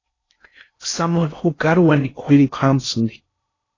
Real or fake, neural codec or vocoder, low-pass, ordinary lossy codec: fake; codec, 16 kHz in and 24 kHz out, 0.6 kbps, FocalCodec, streaming, 4096 codes; 7.2 kHz; AAC, 32 kbps